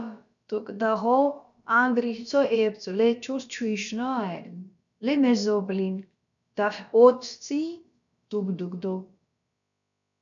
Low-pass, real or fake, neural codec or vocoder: 7.2 kHz; fake; codec, 16 kHz, about 1 kbps, DyCAST, with the encoder's durations